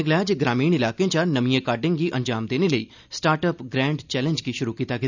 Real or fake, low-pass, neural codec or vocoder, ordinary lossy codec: real; 7.2 kHz; none; none